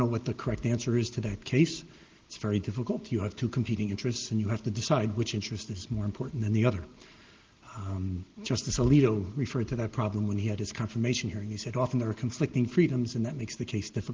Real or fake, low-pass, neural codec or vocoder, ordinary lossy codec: real; 7.2 kHz; none; Opus, 16 kbps